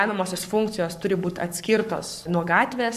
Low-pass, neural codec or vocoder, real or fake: 14.4 kHz; codec, 44.1 kHz, 7.8 kbps, Pupu-Codec; fake